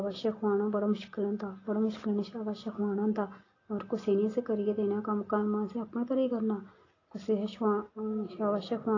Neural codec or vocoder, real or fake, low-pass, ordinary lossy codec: none; real; 7.2 kHz; AAC, 32 kbps